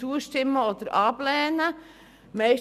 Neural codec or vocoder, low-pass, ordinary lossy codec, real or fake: none; 14.4 kHz; none; real